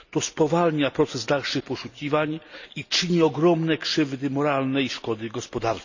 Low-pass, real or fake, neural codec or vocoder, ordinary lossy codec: 7.2 kHz; real; none; none